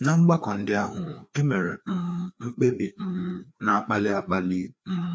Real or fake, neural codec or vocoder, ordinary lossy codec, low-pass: fake; codec, 16 kHz, 2 kbps, FreqCodec, larger model; none; none